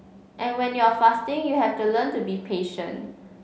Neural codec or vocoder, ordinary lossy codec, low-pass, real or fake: none; none; none; real